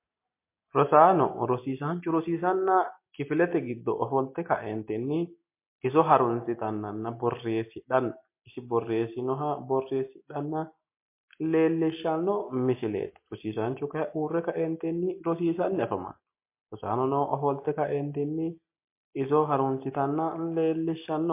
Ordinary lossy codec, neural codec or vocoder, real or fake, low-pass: MP3, 24 kbps; none; real; 3.6 kHz